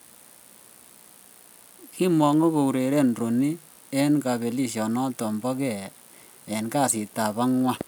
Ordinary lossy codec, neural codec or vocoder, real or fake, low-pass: none; none; real; none